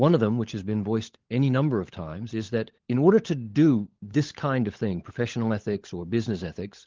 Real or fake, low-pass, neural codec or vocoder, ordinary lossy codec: fake; 7.2 kHz; codec, 16 kHz, 16 kbps, FunCodec, trained on LibriTTS, 50 frames a second; Opus, 16 kbps